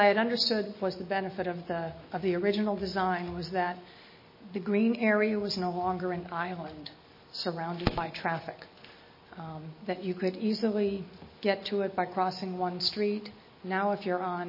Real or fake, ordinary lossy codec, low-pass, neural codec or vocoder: real; MP3, 24 kbps; 5.4 kHz; none